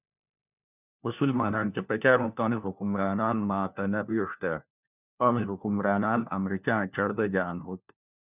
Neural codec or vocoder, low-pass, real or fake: codec, 16 kHz, 1 kbps, FunCodec, trained on LibriTTS, 50 frames a second; 3.6 kHz; fake